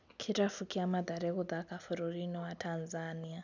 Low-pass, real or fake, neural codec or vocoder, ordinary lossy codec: 7.2 kHz; real; none; none